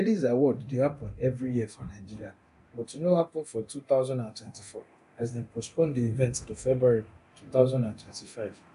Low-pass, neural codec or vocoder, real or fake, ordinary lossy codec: 10.8 kHz; codec, 24 kHz, 0.9 kbps, DualCodec; fake; AAC, 96 kbps